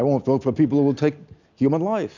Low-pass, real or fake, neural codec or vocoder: 7.2 kHz; real; none